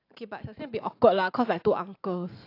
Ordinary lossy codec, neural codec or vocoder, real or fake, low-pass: AAC, 32 kbps; vocoder, 44.1 kHz, 128 mel bands every 512 samples, BigVGAN v2; fake; 5.4 kHz